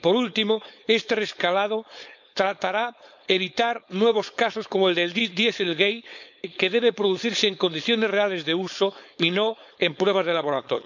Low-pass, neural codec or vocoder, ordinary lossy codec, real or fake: 7.2 kHz; codec, 16 kHz, 4.8 kbps, FACodec; none; fake